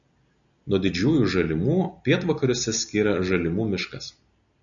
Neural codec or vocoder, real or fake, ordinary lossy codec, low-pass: none; real; MP3, 96 kbps; 7.2 kHz